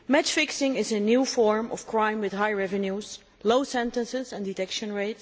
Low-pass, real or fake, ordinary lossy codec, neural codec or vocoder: none; real; none; none